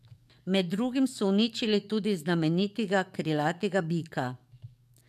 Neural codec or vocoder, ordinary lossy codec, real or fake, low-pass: codec, 44.1 kHz, 7.8 kbps, DAC; MP3, 96 kbps; fake; 14.4 kHz